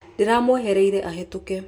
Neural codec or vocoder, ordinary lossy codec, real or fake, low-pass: none; none; real; 19.8 kHz